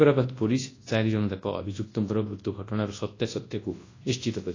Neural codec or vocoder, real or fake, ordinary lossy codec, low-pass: codec, 24 kHz, 0.9 kbps, WavTokenizer, large speech release; fake; AAC, 32 kbps; 7.2 kHz